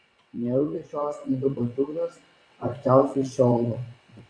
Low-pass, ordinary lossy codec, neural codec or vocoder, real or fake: 9.9 kHz; Opus, 64 kbps; vocoder, 22.05 kHz, 80 mel bands, WaveNeXt; fake